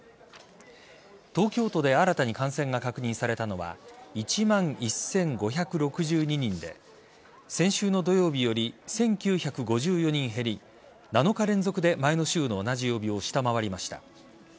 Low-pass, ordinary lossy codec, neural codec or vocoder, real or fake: none; none; none; real